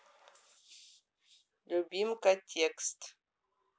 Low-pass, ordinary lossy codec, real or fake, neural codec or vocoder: none; none; real; none